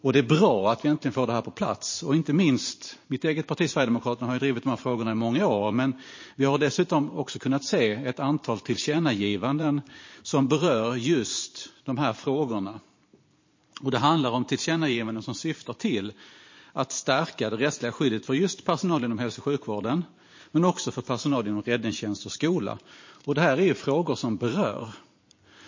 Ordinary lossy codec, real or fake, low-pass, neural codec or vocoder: MP3, 32 kbps; fake; 7.2 kHz; vocoder, 44.1 kHz, 128 mel bands every 512 samples, BigVGAN v2